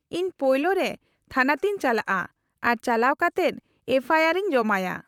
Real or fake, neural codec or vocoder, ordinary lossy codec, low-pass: fake; vocoder, 44.1 kHz, 128 mel bands, Pupu-Vocoder; none; 19.8 kHz